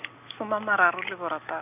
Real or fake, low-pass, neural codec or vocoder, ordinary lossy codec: real; 3.6 kHz; none; none